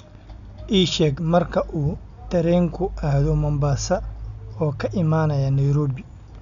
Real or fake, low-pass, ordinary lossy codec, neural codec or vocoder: real; 7.2 kHz; none; none